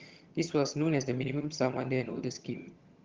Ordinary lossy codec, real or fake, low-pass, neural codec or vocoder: Opus, 16 kbps; fake; 7.2 kHz; vocoder, 22.05 kHz, 80 mel bands, HiFi-GAN